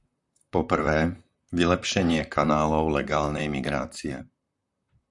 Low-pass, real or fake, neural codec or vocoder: 10.8 kHz; fake; vocoder, 44.1 kHz, 128 mel bands, Pupu-Vocoder